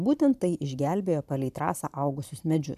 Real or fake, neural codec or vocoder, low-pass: fake; vocoder, 44.1 kHz, 128 mel bands every 512 samples, BigVGAN v2; 14.4 kHz